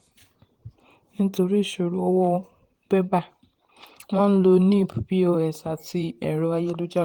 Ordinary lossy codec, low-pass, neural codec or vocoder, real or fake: Opus, 24 kbps; 19.8 kHz; vocoder, 44.1 kHz, 128 mel bands, Pupu-Vocoder; fake